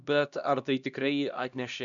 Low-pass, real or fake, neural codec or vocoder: 7.2 kHz; fake; codec, 16 kHz, 1 kbps, X-Codec, HuBERT features, trained on LibriSpeech